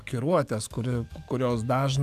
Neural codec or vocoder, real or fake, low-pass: codec, 44.1 kHz, 7.8 kbps, DAC; fake; 14.4 kHz